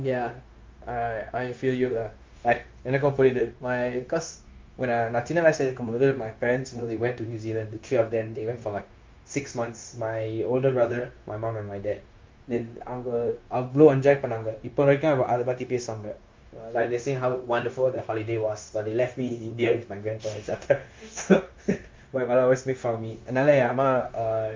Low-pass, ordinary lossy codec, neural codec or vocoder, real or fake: 7.2 kHz; Opus, 32 kbps; codec, 16 kHz, 0.9 kbps, LongCat-Audio-Codec; fake